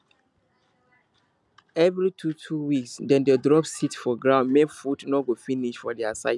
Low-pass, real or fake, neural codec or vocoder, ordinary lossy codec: none; real; none; none